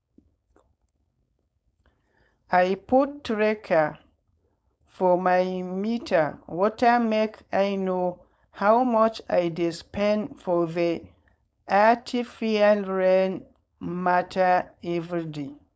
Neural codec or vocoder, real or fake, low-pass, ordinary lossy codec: codec, 16 kHz, 4.8 kbps, FACodec; fake; none; none